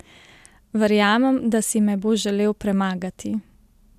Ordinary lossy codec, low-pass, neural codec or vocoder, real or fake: none; 14.4 kHz; none; real